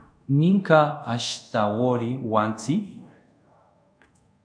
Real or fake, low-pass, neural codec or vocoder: fake; 9.9 kHz; codec, 24 kHz, 0.5 kbps, DualCodec